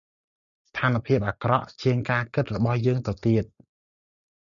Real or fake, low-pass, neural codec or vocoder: real; 7.2 kHz; none